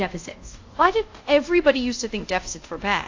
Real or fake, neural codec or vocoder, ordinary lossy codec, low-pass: fake; codec, 24 kHz, 0.5 kbps, DualCodec; AAC, 48 kbps; 7.2 kHz